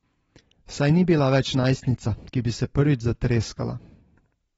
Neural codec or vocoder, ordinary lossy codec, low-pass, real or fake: none; AAC, 24 kbps; 19.8 kHz; real